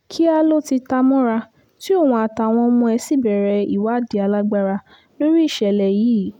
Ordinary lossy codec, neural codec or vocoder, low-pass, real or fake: none; none; 19.8 kHz; real